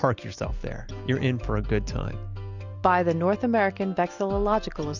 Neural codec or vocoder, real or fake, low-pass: none; real; 7.2 kHz